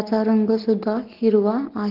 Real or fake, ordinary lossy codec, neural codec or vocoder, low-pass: real; Opus, 16 kbps; none; 5.4 kHz